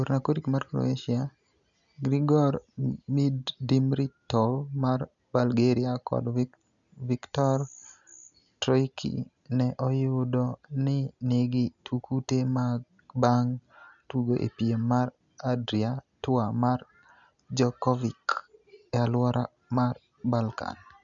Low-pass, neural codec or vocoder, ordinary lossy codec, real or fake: 7.2 kHz; none; none; real